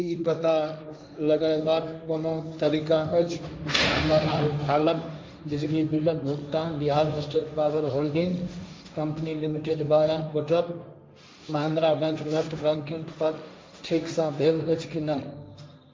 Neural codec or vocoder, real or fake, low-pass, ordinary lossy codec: codec, 16 kHz, 1.1 kbps, Voila-Tokenizer; fake; none; none